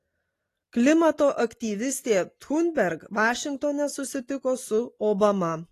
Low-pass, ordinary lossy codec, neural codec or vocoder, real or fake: 14.4 kHz; AAC, 48 kbps; none; real